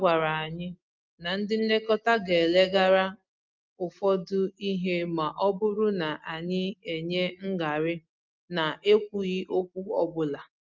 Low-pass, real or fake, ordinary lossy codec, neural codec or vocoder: 7.2 kHz; real; Opus, 24 kbps; none